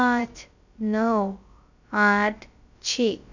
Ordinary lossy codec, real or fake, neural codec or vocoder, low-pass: none; fake; codec, 16 kHz, about 1 kbps, DyCAST, with the encoder's durations; 7.2 kHz